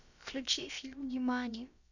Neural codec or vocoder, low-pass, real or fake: codec, 16 kHz, about 1 kbps, DyCAST, with the encoder's durations; 7.2 kHz; fake